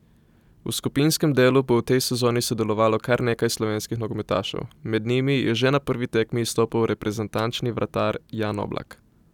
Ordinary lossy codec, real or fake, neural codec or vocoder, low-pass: none; real; none; 19.8 kHz